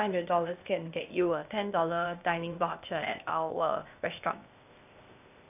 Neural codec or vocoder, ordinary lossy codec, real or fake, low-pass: codec, 16 kHz, 0.8 kbps, ZipCodec; none; fake; 3.6 kHz